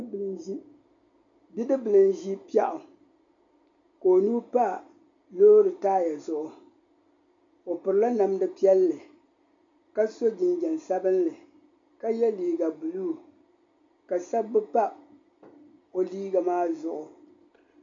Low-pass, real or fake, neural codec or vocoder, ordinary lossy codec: 7.2 kHz; real; none; MP3, 64 kbps